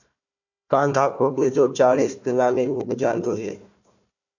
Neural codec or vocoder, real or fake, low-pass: codec, 16 kHz, 1 kbps, FunCodec, trained on Chinese and English, 50 frames a second; fake; 7.2 kHz